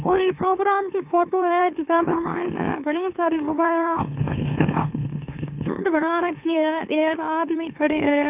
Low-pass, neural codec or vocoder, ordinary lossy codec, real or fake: 3.6 kHz; autoencoder, 44.1 kHz, a latent of 192 numbers a frame, MeloTTS; none; fake